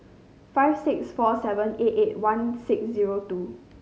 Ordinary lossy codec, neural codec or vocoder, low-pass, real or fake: none; none; none; real